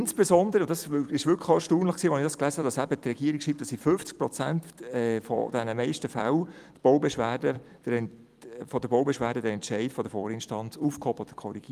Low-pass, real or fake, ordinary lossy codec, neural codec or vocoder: 14.4 kHz; fake; Opus, 32 kbps; vocoder, 44.1 kHz, 128 mel bands every 256 samples, BigVGAN v2